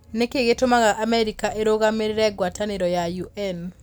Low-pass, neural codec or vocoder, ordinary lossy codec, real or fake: none; none; none; real